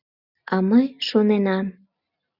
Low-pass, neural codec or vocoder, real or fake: 5.4 kHz; none; real